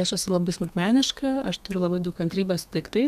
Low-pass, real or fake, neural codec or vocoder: 14.4 kHz; fake; codec, 32 kHz, 1.9 kbps, SNAC